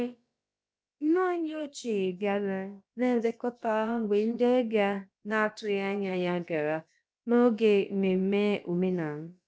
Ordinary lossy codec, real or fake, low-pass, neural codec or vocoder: none; fake; none; codec, 16 kHz, about 1 kbps, DyCAST, with the encoder's durations